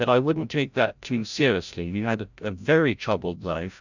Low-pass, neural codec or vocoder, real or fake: 7.2 kHz; codec, 16 kHz, 0.5 kbps, FreqCodec, larger model; fake